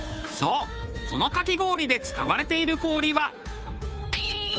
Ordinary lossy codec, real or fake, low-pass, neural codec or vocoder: none; fake; none; codec, 16 kHz, 2 kbps, FunCodec, trained on Chinese and English, 25 frames a second